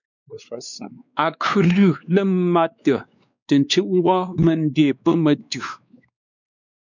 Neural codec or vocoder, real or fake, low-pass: codec, 16 kHz, 2 kbps, X-Codec, WavLM features, trained on Multilingual LibriSpeech; fake; 7.2 kHz